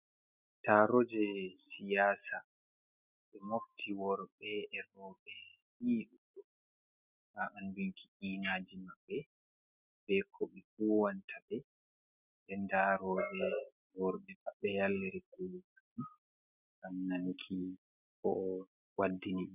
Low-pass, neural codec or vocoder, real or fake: 3.6 kHz; none; real